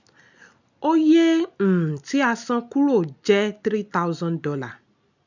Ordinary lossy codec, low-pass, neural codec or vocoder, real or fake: none; 7.2 kHz; none; real